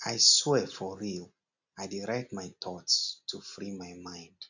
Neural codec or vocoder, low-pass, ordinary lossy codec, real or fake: none; 7.2 kHz; none; real